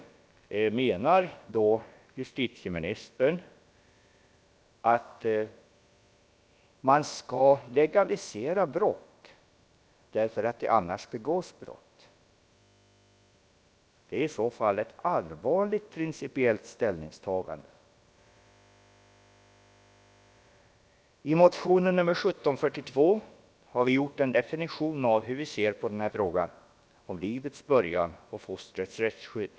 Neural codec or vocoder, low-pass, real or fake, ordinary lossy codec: codec, 16 kHz, about 1 kbps, DyCAST, with the encoder's durations; none; fake; none